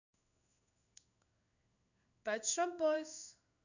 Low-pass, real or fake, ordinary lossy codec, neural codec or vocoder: 7.2 kHz; fake; none; codec, 16 kHz in and 24 kHz out, 1 kbps, XY-Tokenizer